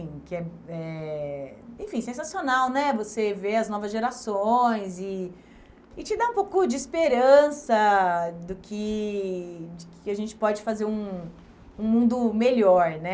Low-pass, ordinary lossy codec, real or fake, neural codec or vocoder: none; none; real; none